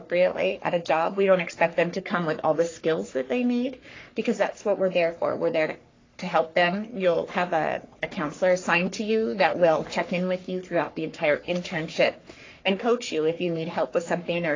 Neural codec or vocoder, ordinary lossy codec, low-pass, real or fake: codec, 44.1 kHz, 3.4 kbps, Pupu-Codec; AAC, 32 kbps; 7.2 kHz; fake